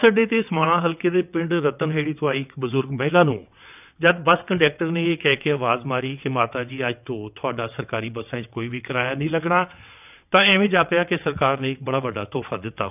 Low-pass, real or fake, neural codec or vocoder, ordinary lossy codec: 3.6 kHz; fake; vocoder, 22.05 kHz, 80 mel bands, WaveNeXt; none